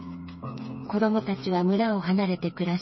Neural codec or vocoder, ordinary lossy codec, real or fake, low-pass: codec, 16 kHz, 4 kbps, FreqCodec, smaller model; MP3, 24 kbps; fake; 7.2 kHz